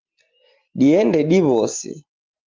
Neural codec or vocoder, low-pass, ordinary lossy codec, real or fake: none; 7.2 kHz; Opus, 32 kbps; real